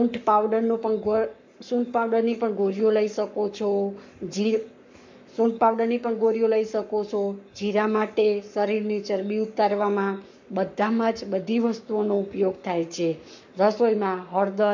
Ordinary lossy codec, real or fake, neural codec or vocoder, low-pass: MP3, 48 kbps; fake; codec, 44.1 kHz, 7.8 kbps, Pupu-Codec; 7.2 kHz